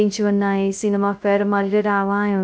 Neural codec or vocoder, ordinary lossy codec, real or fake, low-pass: codec, 16 kHz, 0.2 kbps, FocalCodec; none; fake; none